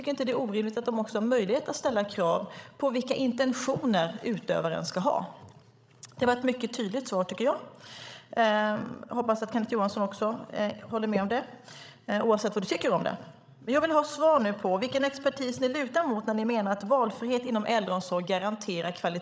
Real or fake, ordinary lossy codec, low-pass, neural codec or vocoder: fake; none; none; codec, 16 kHz, 16 kbps, FreqCodec, larger model